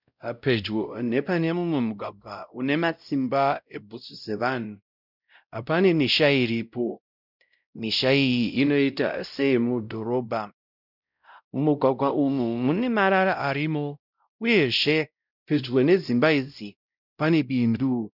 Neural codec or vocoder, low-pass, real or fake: codec, 16 kHz, 0.5 kbps, X-Codec, WavLM features, trained on Multilingual LibriSpeech; 5.4 kHz; fake